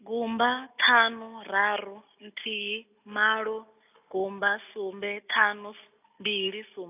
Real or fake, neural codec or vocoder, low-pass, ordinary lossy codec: real; none; 3.6 kHz; AAC, 32 kbps